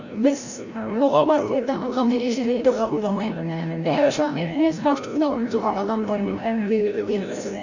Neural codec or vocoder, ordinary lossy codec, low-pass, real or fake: codec, 16 kHz, 0.5 kbps, FreqCodec, larger model; none; 7.2 kHz; fake